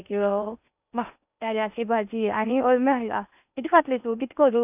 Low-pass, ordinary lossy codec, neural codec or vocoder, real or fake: 3.6 kHz; none; codec, 16 kHz in and 24 kHz out, 0.8 kbps, FocalCodec, streaming, 65536 codes; fake